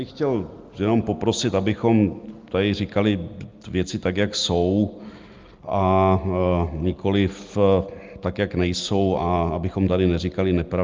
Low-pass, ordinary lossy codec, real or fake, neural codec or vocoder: 7.2 kHz; Opus, 32 kbps; real; none